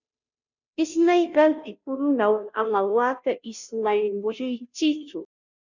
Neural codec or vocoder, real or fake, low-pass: codec, 16 kHz, 0.5 kbps, FunCodec, trained on Chinese and English, 25 frames a second; fake; 7.2 kHz